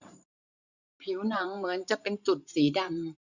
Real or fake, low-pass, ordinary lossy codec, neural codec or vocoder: fake; 7.2 kHz; none; vocoder, 44.1 kHz, 128 mel bands every 512 samples, BigVGAN v2